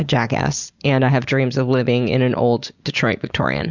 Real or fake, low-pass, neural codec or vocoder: real; 7.2 kHz; none